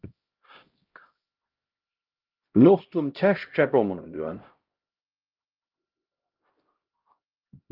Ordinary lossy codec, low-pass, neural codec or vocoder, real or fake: Opus, 32 kbps; 5.4 kHz; codec, 16 kHz, 0.5 kbps, X-Codec, HuBERT features, trained on LibriSpeech; fake